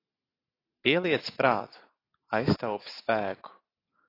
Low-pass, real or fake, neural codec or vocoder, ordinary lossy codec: 5.4 kHz; real; none; AAC, 24 kbps